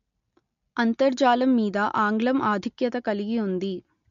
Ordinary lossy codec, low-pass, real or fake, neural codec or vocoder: MP3, 48 kbps; 7.2 kHz; real; none